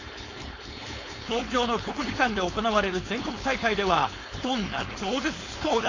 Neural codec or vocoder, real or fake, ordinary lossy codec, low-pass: codec, 16 kHz, 4.8 kbps, FACodec; fake; AAC, 32 kbps; 7.2 kHz